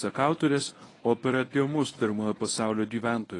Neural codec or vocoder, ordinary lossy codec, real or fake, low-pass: codec, 24 kHz, 0.9 kbps, WavTokenizer, medium speech release version 1; AAC, 32 kbps; fake; 10.8 kHz